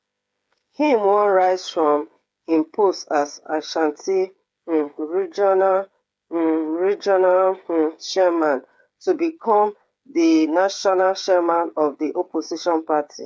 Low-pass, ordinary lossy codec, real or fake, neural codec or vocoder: none; none; fake; codec, 16 kHz, 8 kbps, FreqCodec, smaller model